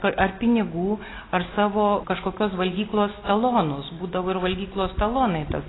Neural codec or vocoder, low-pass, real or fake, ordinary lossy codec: none; 7.2 kHz; real; AAC, 16 kbps